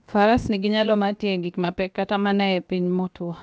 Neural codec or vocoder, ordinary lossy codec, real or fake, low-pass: codec, 16 kHz, about 1 kbps, DyCAST, with the encoder's durations; none; fake; none